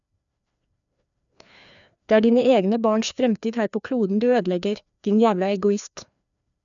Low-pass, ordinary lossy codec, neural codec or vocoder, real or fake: 7.2 kHz; none; codec, 16 kHz, 2 kbps, FreqCodec, larger model; fake